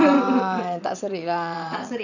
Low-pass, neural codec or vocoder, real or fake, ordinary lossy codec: 7.2 kHz; vocoder, 22.05 kHz, 80 mel bands, WaveNeXt; fake; none